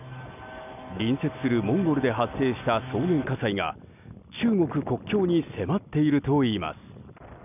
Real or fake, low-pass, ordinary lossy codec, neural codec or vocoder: real; 3.6 kHz; none; none